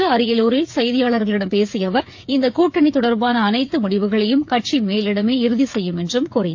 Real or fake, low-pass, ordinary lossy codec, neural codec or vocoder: fake; 7.2 kHz; AAC, 48 kbps; codec, 16 kHz, 8 kbps, FreqCodec, smaller model